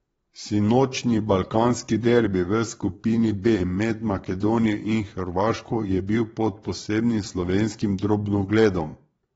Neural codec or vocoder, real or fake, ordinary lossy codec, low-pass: vocoder, 22.05 kHz, 80 mel bands, Vocos; fake; AAC, 24 kbps; 9.9 kHz